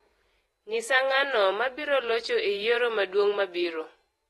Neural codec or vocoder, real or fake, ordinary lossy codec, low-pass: vocoder, 48 kHz, 128 mel bands, Vocos; fake; AAC, 32 kbps; 19.8 kHz